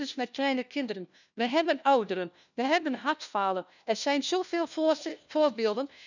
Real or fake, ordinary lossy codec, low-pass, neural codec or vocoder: fake; none; 7.2 kHz; codec, 16 kHz, 1 kbps, FunCodec, trained on LibriTTS, 50 frames a second